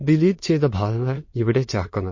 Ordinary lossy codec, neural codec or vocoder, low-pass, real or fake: MP3, 32 kbps; autoencoder, 22.05 kHz, a latent of 192 numbers a frame, VITS, trained on many speakers; 7.2 kHz; fake